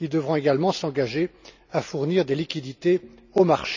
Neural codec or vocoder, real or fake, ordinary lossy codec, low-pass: none; real; none; 7.2 kHz